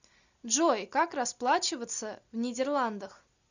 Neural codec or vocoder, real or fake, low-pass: none; real; 7.2 kHz